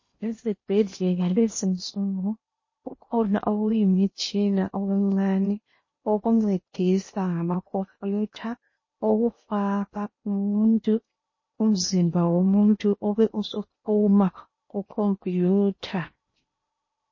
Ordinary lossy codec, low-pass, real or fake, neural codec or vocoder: MP3, 32 kbps; 7.2 kHz; fake; codec, 16 kHz in and 24 kHz out, 0.8 kbps, FocalCodec, streaming, 65536 codes